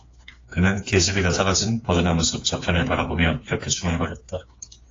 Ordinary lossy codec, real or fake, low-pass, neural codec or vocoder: AAC, 32 kbps; fake; 7.2 kHz; codec, 16 kHz, 4 kbps, FreqCodec, smaller model